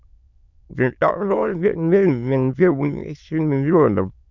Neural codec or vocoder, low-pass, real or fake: autoencoder, 22.05 kHz, a latent of 192 numbers a frame, VITS, trained on many speakers; 7.2 kHz; fake